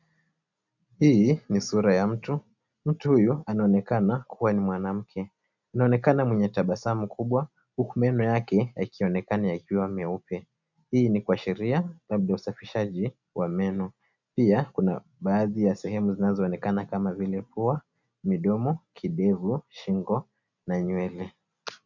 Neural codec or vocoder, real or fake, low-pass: none; real; 7.2 kHz